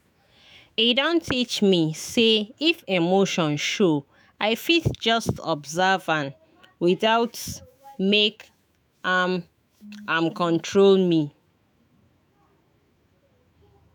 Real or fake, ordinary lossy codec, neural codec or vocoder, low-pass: fake; none; autoencoder, 48 kHz, 128 numbers a frame, DAC-VAE, trained on Japanese speech; none